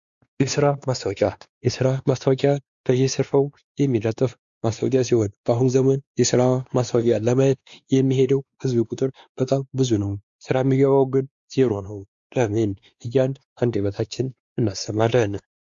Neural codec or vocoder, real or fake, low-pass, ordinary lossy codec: codec, 16 kHz, 2 kbps, X-Codec, WavLM features, trained on Multilingual LibriSpeech; fake; 7.2 kHz; Opus, 64 kbps